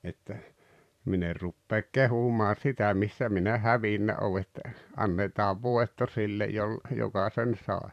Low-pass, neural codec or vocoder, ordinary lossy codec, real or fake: 14.4 kHz; vocoder, 44.1 kHz, 128 mel bands every 512 samples, BigVGAN v2; none; fake